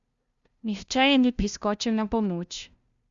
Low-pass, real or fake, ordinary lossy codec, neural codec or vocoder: 7.2 kHz; fake; Opus, 64 kbps; codec, 16 kHz, 0.5 kbps, FunCodec, trained on LibriTTS, 25 frames a second